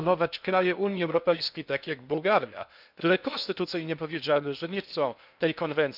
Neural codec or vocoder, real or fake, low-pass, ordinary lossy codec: codec, 16 kHz in and 24 kHz out, 0.6 kbps, FocalCodec, streaming, 2048 codes; fake; 5.4 kHz; none